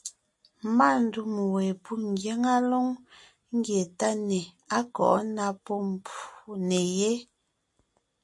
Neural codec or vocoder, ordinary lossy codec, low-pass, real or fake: none; MP3, 48 kbps; 10.8 kHz; real